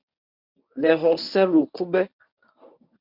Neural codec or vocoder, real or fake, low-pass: codec, 24 kHz, 0.9 kbps, WavTokenizer, medium speech release version 1; fake; 5.4 kHz